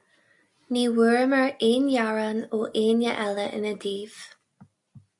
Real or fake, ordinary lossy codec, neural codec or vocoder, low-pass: real; AAC, 64 kbps; none; 10.8 kHz